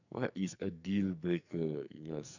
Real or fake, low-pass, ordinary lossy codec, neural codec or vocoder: fake; 7.2 kHz; none; codec, 44.1 kHz, 2.6 kbps, SNAC